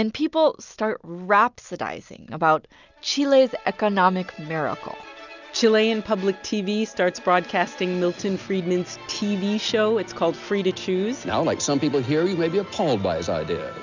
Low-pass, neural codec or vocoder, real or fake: 7.2 kHz; none; real